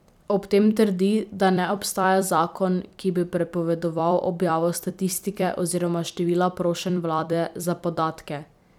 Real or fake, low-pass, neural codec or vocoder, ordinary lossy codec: fake; 19.8 kHz; vocoder, 44.1 kHz, 128 mel bands every 256 samples, BigVGAN v2; none